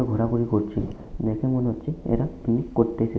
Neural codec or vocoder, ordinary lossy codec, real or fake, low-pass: none; none; real; none